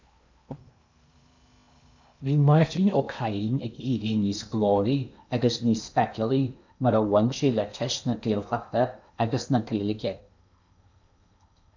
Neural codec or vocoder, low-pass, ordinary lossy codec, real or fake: codec, 16 kHz in and 24 kHz out, 0.8 kbps, FocalCodec, streaming, 65536 codes; 7.2 kHz; MP3, 64 kbps; fake